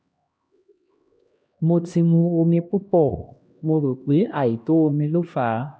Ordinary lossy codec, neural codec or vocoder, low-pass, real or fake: none; codec, 16 kHz, 1 kbps, X-Codec, HuBERT features, trained on LibriSpeech; none; fake